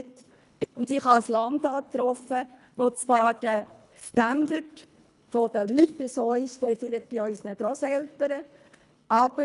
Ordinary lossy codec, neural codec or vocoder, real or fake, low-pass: none; codec, 24 kHz, 1.5 kbps, HILCodec; fake; 10.8 kHz